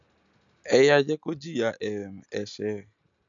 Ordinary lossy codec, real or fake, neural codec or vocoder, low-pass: none; real; none; 7.2 kHz